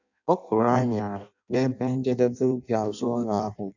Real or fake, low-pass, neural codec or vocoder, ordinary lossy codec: fake; 7.2 kHz; codec, 16 kHz in and 24 kHz out, 0.6 kbps, FireRedTTS-2 codec; none